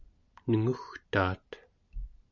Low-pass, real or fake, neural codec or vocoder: 7.2 kHz; real; none